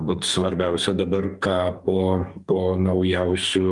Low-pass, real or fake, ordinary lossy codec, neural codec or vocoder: 10.8 kHz; fake; Opus, 32 kbps; codec, 44.1 kHz, 2.6 kbps, SNAC